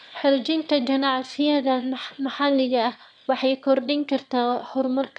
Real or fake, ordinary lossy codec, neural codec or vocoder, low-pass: fake; none; autoencoder, 22.05 kHz, a latent of 192 numbers a frame, VITS, trained on one speaker; 9.9 kHz